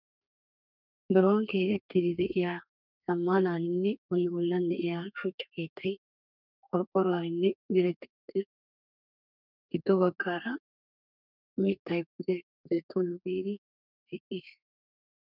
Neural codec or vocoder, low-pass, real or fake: codec, 32 kHz, 1.9 kbps, SNAC; 5.4 kHz; fake